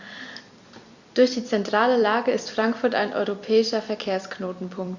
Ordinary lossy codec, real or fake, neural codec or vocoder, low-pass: Opus, 64 kbps; fake; vocoder, 44.1 kHz, 128 mel bands every 256 samples, BigVGAN v2; 7.2 kHz